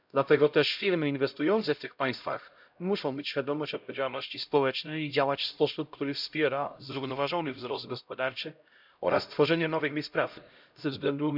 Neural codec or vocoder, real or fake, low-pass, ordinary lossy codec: codec, 16 kHz, 0.5 kbps, X-Codec, HuBERT features, trained on LibriSpeech; fake; 5.4 kHz; none